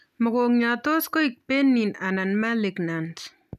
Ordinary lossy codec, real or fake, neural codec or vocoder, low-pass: none; real; none; 14.4 kHz